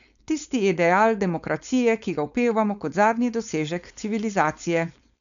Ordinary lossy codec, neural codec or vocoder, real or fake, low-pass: none; codec, 16 kHz, 4.8 kbps, FACodec; fake; 7.2 kHz